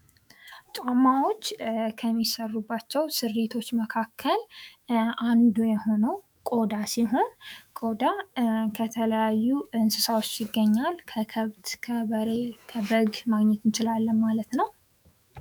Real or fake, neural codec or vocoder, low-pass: fake; autoencoder, 48 kHz, 128 numbers a frame, DAC-VAE, trained on Japanese speech; 19.8 kHz